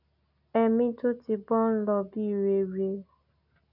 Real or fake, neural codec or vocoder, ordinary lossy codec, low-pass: real; none; none; 5.4 kHz